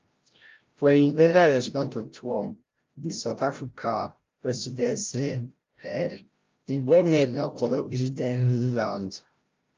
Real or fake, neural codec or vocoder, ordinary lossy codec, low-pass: fake; codec, 16 kHz, 0.5 kbps, FreqCodec, larger model; Opus, 32 kbps; 7.2 kHz